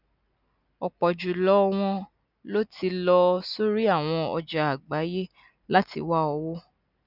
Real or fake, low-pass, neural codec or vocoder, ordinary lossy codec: real; 5.4 kHz; none; none